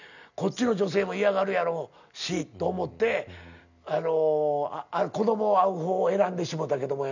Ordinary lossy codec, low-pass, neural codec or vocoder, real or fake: none; 7.2 kHz; none; real